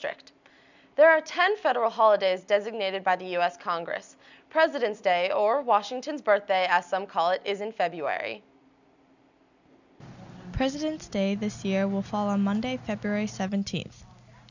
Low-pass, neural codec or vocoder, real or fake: 7.2 kHz; none; real